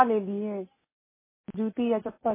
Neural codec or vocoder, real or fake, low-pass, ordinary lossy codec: none; real; 3.6 kHz; MP3, 16 kbps